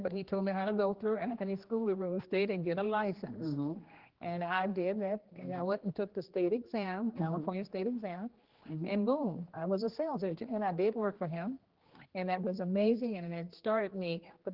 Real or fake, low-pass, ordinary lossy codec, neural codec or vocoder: fake; 5.4 kHz; Opus, 16 kbps; codec, 16 kHz, 2 kbps, X-Codec, HuBERT features, trained on general audio